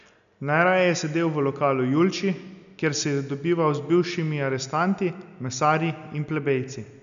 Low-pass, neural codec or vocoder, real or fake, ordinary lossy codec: 7.2 kHz; none; real; none